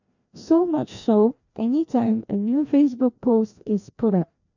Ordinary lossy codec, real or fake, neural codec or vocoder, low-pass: none; fake; codec, 16 kHz, 1 kbps, FreqCodec, larger model; 7.2 kHz